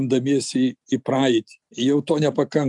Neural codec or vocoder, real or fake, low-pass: none; real; 10.8 kHz